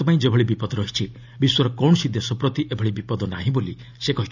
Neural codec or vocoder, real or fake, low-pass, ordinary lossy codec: none; real; 7.2 kHz; none